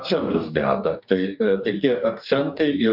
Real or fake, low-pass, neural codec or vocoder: fake; 5.4 kHz; codec, 44.1 kHz, 2.6 kbps, DAC